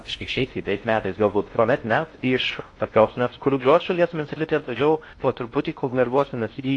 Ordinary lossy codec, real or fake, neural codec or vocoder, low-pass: AAC, 48 kbps; fake; codec, 16 kHz in and 24 kHz out, 0.6 kbps, FocalCodec, streaming, 4096 codes; 10.8 kHz